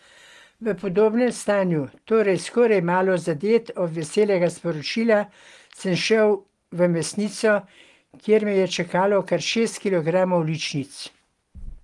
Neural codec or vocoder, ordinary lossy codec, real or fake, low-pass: none; Opus, 24 kbps; real; 10.8 kHz